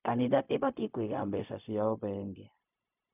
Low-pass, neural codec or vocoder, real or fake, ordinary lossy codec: 3.6 kHz; codec, 16 kHz, 0.4 kbps, LongCat-Audio-Codec; fake; none